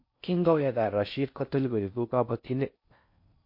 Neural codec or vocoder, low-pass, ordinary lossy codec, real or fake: codec, 16 kHz in and 24 kHz out, 0.6 kbps, FocalCodec, streaming, 4096 codes; 5.4 kHz; MP3, 32 kbps; fake